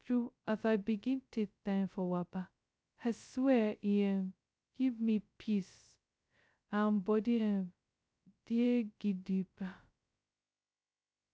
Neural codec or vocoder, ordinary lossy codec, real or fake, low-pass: codec, 16 kHz, 0.2 kbps, FocalCodec; none; fake; none